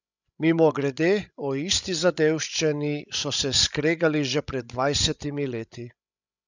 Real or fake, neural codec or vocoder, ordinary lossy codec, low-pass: fake; codec, 16 kHz, 16 kbps, FreqCodec, larger model; none; 7.2 kHz